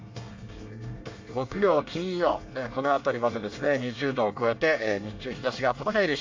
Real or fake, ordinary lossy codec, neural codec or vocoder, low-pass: fake; MP3, 48 kbps; codec, 24 kHz, 1 kbps, SNAC; 7.2 kHz